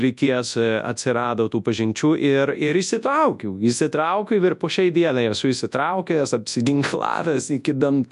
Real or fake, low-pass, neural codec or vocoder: fake; 10.8 kHz; codec, 24 kHz, 0.9 kbps, WavTokenizer, large speech release